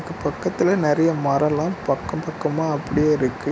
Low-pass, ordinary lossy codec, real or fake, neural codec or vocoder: none; none; real; none